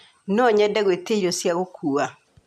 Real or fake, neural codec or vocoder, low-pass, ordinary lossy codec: real; none; 14.4 kHz; MP3, 96 kbps